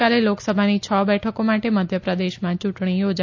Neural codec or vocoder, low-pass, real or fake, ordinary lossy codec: none; 7.2 kHz; real; AAC, 48 kbps